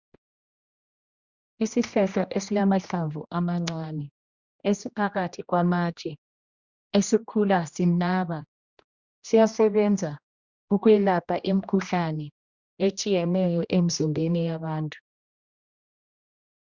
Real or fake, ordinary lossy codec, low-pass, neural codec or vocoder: fake; Opus, 64 kbps; 7.2 kHz; codec, 16 kHz, 1 kbps, X-Codec, HuBERT features, trained on general audio